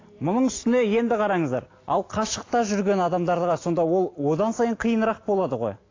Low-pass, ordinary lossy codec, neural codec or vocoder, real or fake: 7.2 kHz; AAC, 32 kbps; none; real